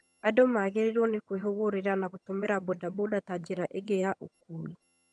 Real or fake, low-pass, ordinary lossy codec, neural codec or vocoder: fake; none; none; vocoder, 22.05 kHz, 80 mel bands, HiFi-GAN